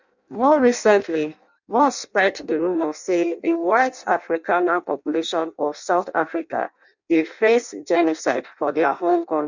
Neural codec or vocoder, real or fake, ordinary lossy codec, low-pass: codec, 16 kHz in and 24 kHz out, 0.6 kbps, FireRedTTS-2 codec; fake; none; 7.2 kHz